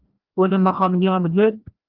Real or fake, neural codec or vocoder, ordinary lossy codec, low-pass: fake; codec, 16 kHz, 1 kbps, FreqCodec, larger model; Opus, 32 kbps; 5.4 kHz